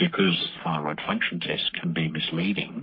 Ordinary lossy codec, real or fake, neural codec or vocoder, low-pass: MP3, 32 kbps; fake; codec, 44.1 kHz, 3.4 kbps, Pupu-Codec; 5.4 kHz